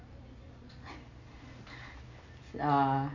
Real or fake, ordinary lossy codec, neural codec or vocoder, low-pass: real; none; none; 7.2 kHz